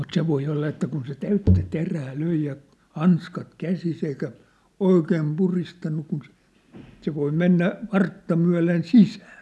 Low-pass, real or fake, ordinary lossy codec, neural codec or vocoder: none; real; none; none